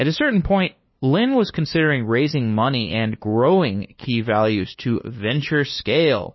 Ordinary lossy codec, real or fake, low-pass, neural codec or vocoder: MP3, 24 kbps; fake; 7.2 kHz; codec, 16 kHz, 2 kbps, FunCodec, trained on LibriTTS, 25 frames a second